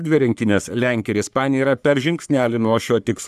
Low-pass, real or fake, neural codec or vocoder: 14.4 kHz; fake; codec, 44.1 kHz, 3.4 kbps, Pupu-Codec